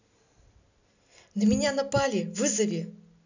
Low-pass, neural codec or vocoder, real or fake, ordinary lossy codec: 7.2 kHz; none; real; none